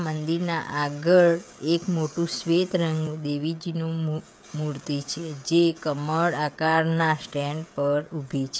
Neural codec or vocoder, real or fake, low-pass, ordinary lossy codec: codec, 16 kHz, 16 kbps, FreqCodec, smaller model; fake; none; none